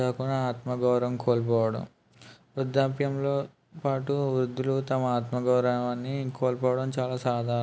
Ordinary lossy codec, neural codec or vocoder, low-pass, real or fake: none; none; none; real